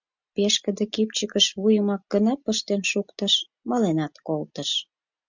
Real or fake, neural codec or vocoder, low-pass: real; none; 7.2 kHz